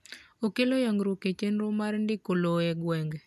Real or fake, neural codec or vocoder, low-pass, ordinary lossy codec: real; none; 14.4 kHz; none